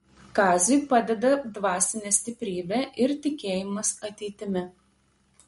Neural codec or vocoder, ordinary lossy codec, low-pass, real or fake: none; MP3, 48 kbps; 19.8 kHz; real